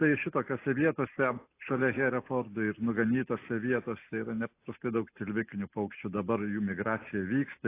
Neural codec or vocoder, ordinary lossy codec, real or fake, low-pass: none; AAC, 24 kbps; real; 3.6 kHz